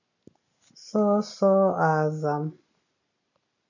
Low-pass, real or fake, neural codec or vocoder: 7.2 kHz; real; none